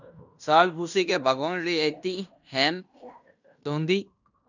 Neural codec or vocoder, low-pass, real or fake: codec, 16 kHz in and 24 kHz out, 0.9 kbps, LongCat-Audio-Codec, fine tuned four codebook decoder; 7.2 kHz; fake